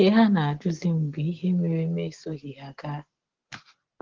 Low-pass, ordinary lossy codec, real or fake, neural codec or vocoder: 7.2 kHz; Opus, 16 kbps; real; none